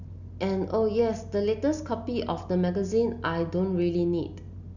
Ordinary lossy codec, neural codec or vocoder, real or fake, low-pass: none; none; real; 7.2 kHz